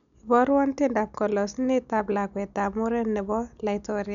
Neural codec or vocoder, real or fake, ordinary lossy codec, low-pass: none; real; none; 7.2 kHz